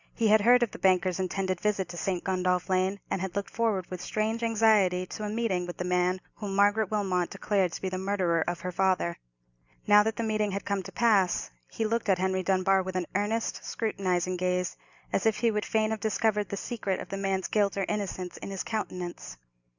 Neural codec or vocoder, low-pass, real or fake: none; 7.2 kHz; real